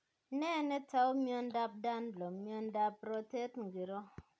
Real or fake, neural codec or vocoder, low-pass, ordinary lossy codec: real; none; none; none